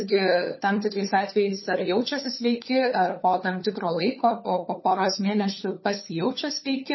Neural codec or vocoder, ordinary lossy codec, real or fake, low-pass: codec, 16 kHz, 4 kbps, FunCodec, trained on LibriTTS, 50 frames a second; MP3, 24 kbps; fake; 7.2 kHz